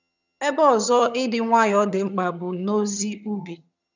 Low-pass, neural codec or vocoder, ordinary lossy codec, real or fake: 7.2 kHz; vocoder, 22.05 kHz, 80 mel bands, HiFi-GAN; none; fake